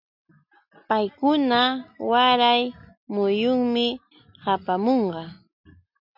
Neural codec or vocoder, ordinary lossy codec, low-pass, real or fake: none; MP3, 48 kbps; 5.4 kHz; real